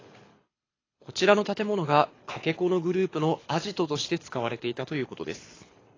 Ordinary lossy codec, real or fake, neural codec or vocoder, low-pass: AAC, 32 kbps; fake; codec, 24 kHz, 6 kbps, HILCodec; 7.2 kHz